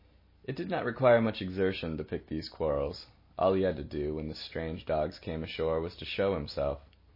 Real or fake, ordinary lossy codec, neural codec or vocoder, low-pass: real; MP3, 24 kbps; none; 5.4 kHz